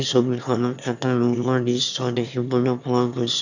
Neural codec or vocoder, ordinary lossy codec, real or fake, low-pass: autoencoder, 22.05 kHz, a latent of 192 numbers a frame, VITS, trained on one speaker; none; fake; 7.2 kHz